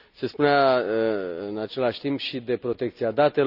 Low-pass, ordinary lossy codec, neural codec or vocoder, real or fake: 5.4 kHz; none; none; real